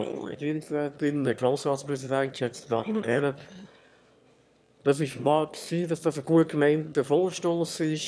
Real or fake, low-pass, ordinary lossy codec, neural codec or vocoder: fake; none; none; autoencoder, 22.05 kHz, a latent of 192 numbers a frame, VITS, trained on one speaker